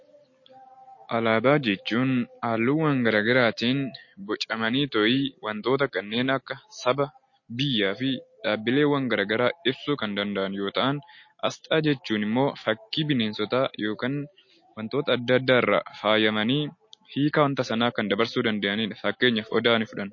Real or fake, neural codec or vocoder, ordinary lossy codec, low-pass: real; none; MP3, 32 kbps; 7.2 kHz